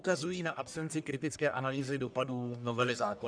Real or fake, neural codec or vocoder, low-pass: fake; codec, 44.1 kHz, 1.7 kbps, Pupu-Codec; 9.9 kHz